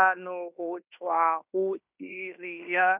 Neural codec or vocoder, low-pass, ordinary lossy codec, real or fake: codec, 16 kHz in and 24 kHz out, 0.9 kbps, LongCat-Audio-Codec, fine tuned four codebook decoder; 3.6 kHz; none; fake